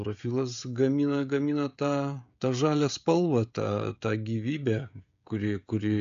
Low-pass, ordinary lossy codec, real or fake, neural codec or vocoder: 7.2 kHz; AAC, 64 kbps; fake; codec, 16 kHz, 16 kbps, FreqCodec, smaller model